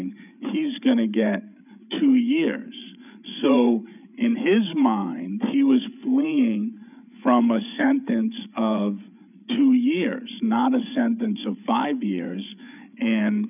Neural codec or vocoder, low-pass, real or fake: codec, 16 kHz, 8 kbps, FreqCodec, larger model; 3.6 kHz; fake